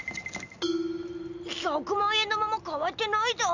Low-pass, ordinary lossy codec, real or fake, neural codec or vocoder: 7.2 kHz; none; real; none